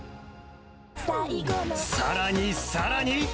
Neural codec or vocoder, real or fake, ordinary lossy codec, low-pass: none; real; none; none